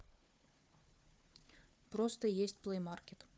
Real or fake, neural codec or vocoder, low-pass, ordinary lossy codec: fake; codec, 16 kHz, 4 kbps, FunCodec, trained on Chinese and English, 50 frames a second; none; none